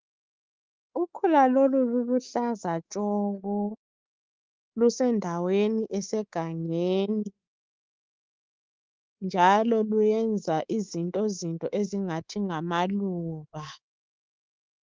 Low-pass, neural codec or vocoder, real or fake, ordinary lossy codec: 7.2 kHz; codec, 24 kHz, 3.1 kbps, DualCodec; fake; Opus, 24 kbps